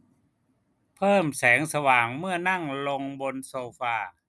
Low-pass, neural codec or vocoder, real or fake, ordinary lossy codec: none; none; real; none